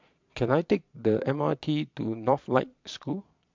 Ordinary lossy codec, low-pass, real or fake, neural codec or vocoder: MP3, 64 kbps; 7.2 kHz; fake; vocoder, 22.05 kHz, 80 mel bands, Vocos